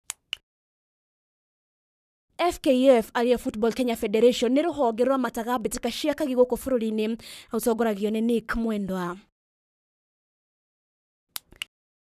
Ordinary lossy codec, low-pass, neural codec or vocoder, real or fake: none; 14.4 kHz; codec, 44.1 kHz, 7.8 kbps, Pupu-Codec; fake